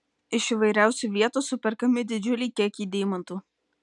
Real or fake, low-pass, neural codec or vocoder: real; 10.8 kHz; none